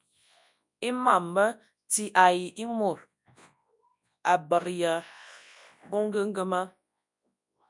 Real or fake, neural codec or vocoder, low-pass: fake; codec, 24 kHz, 0.9 kbps, WavTokenizer, large speech release; 10.8 kHz